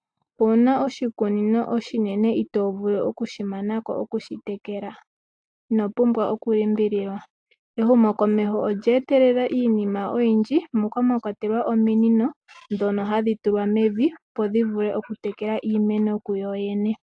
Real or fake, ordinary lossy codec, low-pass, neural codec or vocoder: real; AAC, 64 kbps; 9.9 kHz; none